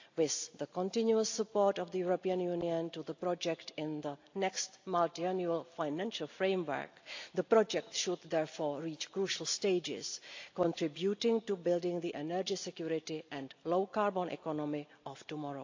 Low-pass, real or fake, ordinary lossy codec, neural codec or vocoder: 7.2 kHz; real; AAC, 48 kbps; none